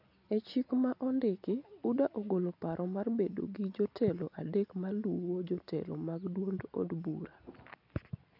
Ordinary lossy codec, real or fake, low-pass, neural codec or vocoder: none; real; 5.4 kHz; none